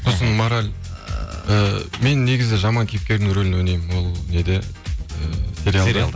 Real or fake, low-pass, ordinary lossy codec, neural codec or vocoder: real; none; none; none